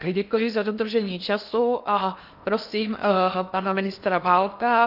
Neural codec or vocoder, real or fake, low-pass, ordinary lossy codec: codec, 16 kHz in and 24 kHz out, 0.8 kbps, FocalCodec, streaming, 65536 codes; fake; 5.4 kHz; AAC, 48 kbps